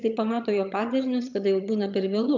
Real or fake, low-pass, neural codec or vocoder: fake; 7.2 kHz; vocoder, 22.05 kHz, 80 mel bands, HiFi-GAN